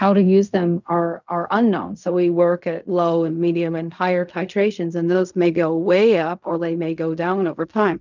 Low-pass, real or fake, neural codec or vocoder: 7.2 kHz; fake; codec, 16 kHz in and 24 kHz out, 0.4 kbps, LongCat-Audio-Codec, fine tuned four codebook decoder